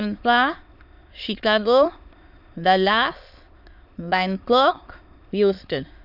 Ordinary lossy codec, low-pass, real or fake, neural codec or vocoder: none; 5.4 kHz; fake; autoencoder, 22.05 kHz, a latent of 192 numbers a frame, VITS, trained on many speakers